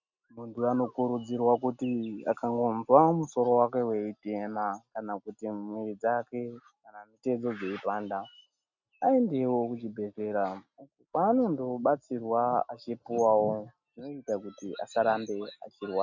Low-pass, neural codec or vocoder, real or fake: 7.2 kHz; none; real